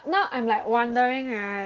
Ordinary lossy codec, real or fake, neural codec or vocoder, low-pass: Opus, 16 kbps; real; none; 7.2 kHz